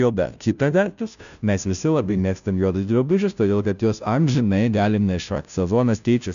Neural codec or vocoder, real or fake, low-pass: codec, 16 kHz, 0.5 kbps, FunCodec, trained on Chinese and English, 25 frames a second; fake; 7.2 kHz